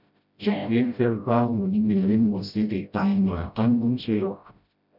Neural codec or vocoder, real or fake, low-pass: codec, 16 kHz, 0.5 kbps, FreqCodec, smaller model; fake; 5.4 kHz